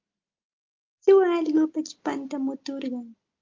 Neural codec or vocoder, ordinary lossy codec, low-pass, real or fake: none; Opus, 24 kbps; 7.2 kHz; real